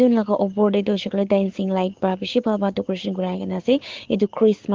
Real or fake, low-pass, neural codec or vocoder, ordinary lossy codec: fake; 7.2 kHz; codec, 16 kHz, 6 kbps, DAC; Opus, 16 kbps